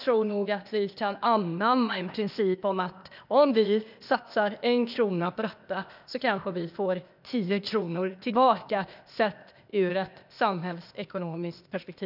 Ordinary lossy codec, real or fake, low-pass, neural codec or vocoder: none; fake; 5.4 kHz; codec, 16 kHz, 0.8 kbps, ZipCodec